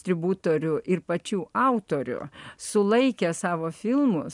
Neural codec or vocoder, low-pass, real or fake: none; 10.8 kHz; real